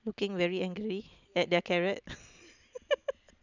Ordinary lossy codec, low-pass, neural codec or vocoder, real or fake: none; 7.2 kHz; none; real